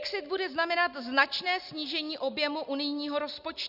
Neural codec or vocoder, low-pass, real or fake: none; 5.4 kHz; real